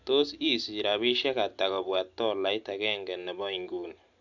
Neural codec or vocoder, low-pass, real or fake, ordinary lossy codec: none; 7.2 kHz; real; none